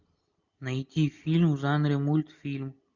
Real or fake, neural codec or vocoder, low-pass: real; none; 7.2 kHz